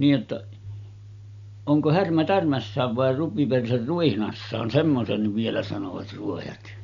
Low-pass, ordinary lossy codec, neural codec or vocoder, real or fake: 7.2 kHz; none; none; real